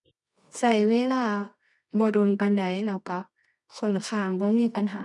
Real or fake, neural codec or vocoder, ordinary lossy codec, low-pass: fake; codec, 24 kHz, 0.9 kbps, WavTokenizer, medium music audio release; none; 10.8 kHz